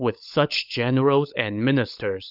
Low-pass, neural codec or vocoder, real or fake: 5.4 kHz; codec, 16 kHz, 16 kbps, FunCodec, trained on LibriTTS, 50 frames a second; fake